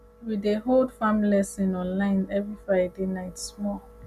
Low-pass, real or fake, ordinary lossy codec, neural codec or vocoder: 14.4 kHz; real; none; none